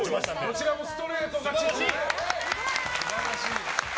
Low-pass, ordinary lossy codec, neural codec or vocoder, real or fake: none; none; none; real